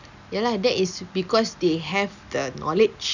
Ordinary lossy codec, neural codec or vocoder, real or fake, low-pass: none; none; real; 7.2 kHz